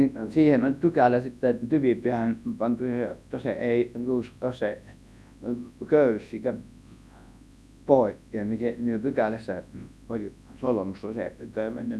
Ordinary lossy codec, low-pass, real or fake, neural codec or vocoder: none; none; fake; codec, 24 kHz, 0.9 kbps, WavTokenizer, large speech release